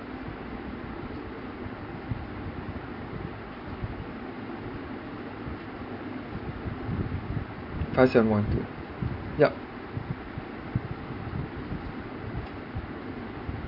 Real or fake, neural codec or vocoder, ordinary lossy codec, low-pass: real; none; MP3, 32 kbps; 5.4 kHz